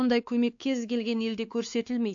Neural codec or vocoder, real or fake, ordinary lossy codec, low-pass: codec, 16 kHz, 2 kbps, X-Codec, WavLM features, trained on Multilingual LibriSpeech; fake; AAC, 64 kbps; 7.2 kHz